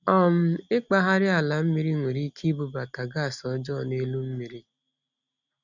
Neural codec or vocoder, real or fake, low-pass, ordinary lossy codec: none; real; 7.2 kHz; none